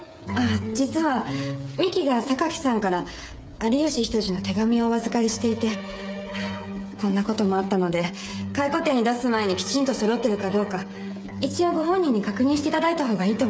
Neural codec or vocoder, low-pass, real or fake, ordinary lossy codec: codec, 16 kHz, 8 kbps, FreqCodec, smaller model; none; fake; none